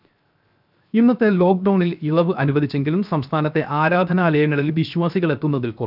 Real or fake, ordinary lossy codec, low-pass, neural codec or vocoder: fake; none; 5.4 kHz; codec, 16 kHz, 0.7 kbps, FocalCodec